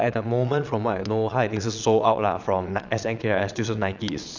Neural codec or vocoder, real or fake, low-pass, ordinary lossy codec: vocoder, 22.05 kHz, 80 mel bands, Vocos; fake; 7.2 kHz; none